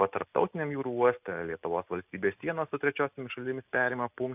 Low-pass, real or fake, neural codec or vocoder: 3.6 kHz; real; none